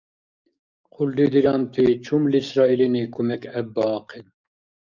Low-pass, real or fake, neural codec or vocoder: 7.2 kHz; fake; codec, 44.1 kHz, 7.8 kbps, DAC